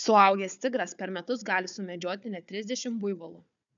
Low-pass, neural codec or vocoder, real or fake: 7.2 kHz; codec, 16 kHz, 4 kbps, FunCodec, trained on Chinese and English, 50 frames a second; fake